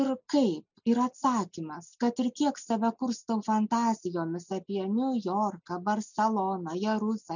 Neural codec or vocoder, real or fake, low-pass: none; real; 7.2 kHz